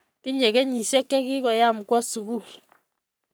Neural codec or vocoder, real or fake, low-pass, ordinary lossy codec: codec, 44.1 kHz, 3.4 kbps, Pupu-Codec; fake; none; none